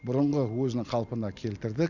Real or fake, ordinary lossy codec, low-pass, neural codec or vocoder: real; none; 7.2 kHz; none